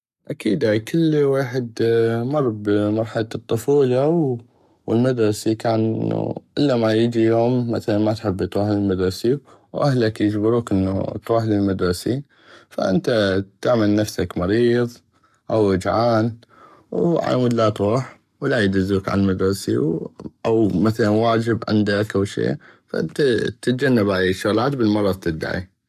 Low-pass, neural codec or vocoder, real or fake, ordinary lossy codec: 14.4 kHz; codec, 44.1 kHz, 7.8 kbps, Pupu-Codec; fake; none